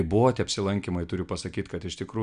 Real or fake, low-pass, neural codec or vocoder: real; 9.9 kHz; none